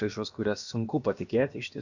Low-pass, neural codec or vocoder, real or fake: 7.2 kHz; codec, 16 kHz, about 1 kbps, DyCAST, with the encoder's durations; fake